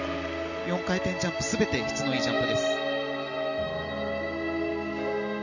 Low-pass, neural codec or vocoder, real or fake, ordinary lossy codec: 7.2 kHz; none; real; none